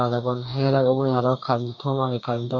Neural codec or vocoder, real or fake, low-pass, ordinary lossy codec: codec, 44.1 kHz, 2.6 kbps, DAC; fake; 7.2 kHz; none